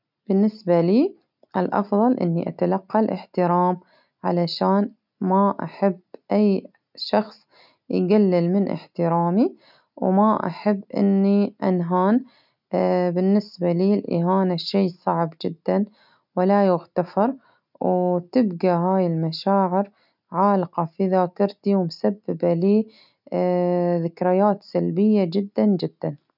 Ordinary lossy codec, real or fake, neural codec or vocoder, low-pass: none; real; none; 5.4 kHz